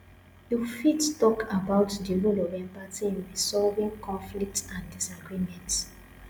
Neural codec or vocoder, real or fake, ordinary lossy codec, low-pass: none; real; none; none